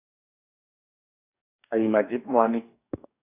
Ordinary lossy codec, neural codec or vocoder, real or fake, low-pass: AAC, 24 kbps; codec, 44.1 kHz, 2.6 kbps, DAC; fake; 3.6 kHz